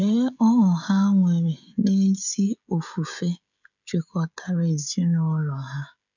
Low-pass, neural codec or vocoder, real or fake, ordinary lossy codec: 7.2 kHz; codec, 16 kHz, 16 kbps, FreqCodec, smaller model; fake; none